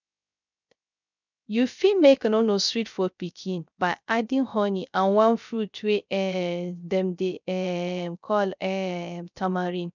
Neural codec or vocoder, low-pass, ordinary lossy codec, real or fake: codec, 16 kHz, 0.3 kbps, FocalCodec; 7.2 kHz; none; fake